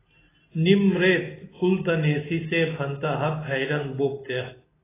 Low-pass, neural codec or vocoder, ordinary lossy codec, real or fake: 3.6 kHz; none; AAC, 16 kbps; real